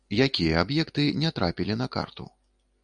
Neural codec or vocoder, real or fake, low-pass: none; real; 9.9 kHz